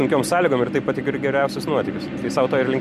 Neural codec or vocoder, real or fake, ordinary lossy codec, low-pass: none; real; MP3, 64 kbps; 14.4 kHz